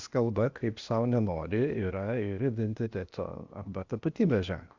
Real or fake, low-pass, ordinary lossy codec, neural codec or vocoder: fake; 7.2 kHz; Opus, 64 kbps; codec, 16 kHz, 0.8 kbps, ZipCodec